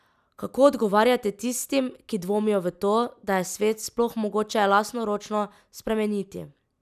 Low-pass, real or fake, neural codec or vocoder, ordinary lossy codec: 14.4 kHz; real; none; none